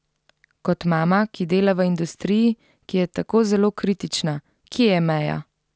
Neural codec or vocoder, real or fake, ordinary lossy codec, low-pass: none; real; none; none